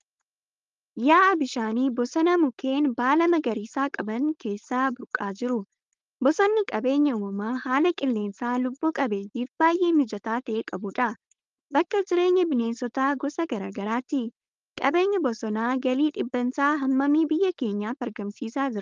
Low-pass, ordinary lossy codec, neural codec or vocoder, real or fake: 7.2 kHz; Opus, 32 kbps; codec, 16 kHz, 4.8 kbps, FACodec; fake